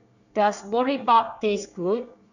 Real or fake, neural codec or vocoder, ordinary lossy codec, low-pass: fake; codec, 24 kHz, 1 kbps, SNAC; none; 7.2 kHz